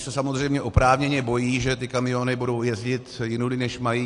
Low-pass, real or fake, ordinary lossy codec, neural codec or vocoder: 10.8 kHz; real; AAC, 48 kbps; none